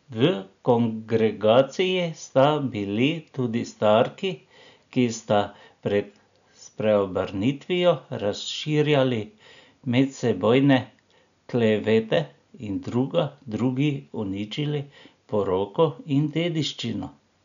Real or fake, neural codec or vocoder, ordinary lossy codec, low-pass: real; none; none; 7.2 kHz